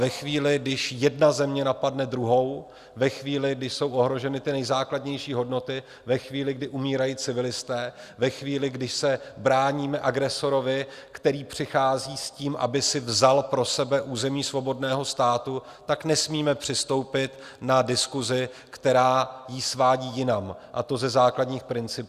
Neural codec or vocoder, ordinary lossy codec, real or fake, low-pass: none; Opus, 64 kbps; real; 14.4 kHz